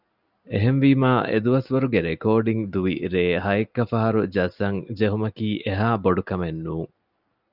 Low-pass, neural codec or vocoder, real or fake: 5.4 kHz; none; real